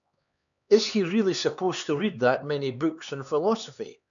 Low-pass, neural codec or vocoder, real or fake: 7.2 kHz; codec, 16 kHz, 4 kbps, X-Codec, HuBERT features, trained on LibriSpeech; fake